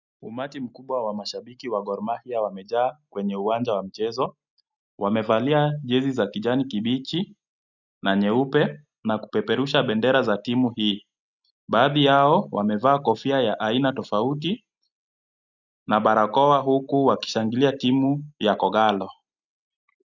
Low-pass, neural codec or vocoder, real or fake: 7.2 kHz; none; real